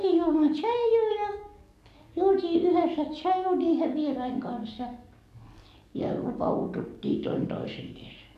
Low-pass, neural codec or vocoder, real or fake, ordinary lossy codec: 14.4 kHz; codec, 44.1 kHz, 7.8 kbps, DAC; fake; none